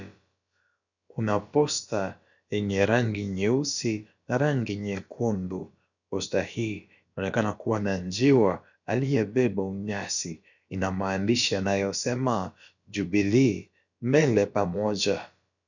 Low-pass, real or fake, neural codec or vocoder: 7.2 kHz; fake; codec, 16 kHz, about 1 kbps, DyCAST, with the encoder's durations